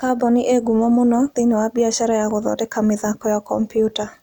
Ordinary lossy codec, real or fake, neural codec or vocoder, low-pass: none; real; none; 19.8 kHz